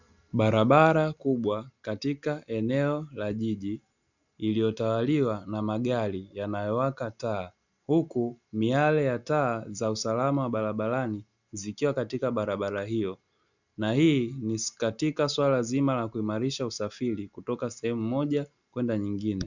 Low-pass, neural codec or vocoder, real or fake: 7.2 kHz; none; real